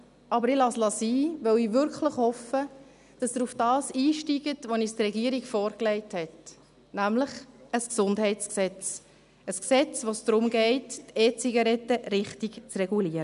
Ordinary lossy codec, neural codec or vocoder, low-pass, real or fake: none; none; 10.8 kHz; real